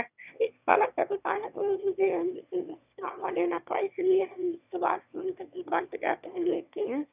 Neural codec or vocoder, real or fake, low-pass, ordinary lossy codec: autoencoder, 22.05 kHz, a latent of 192 numbers a frame, VITS, trained on one speaker; fake; 3.6 kHz; none